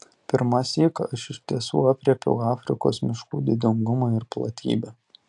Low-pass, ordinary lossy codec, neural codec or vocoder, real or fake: 10.8 kHz; AAC, 64 kbps; none; real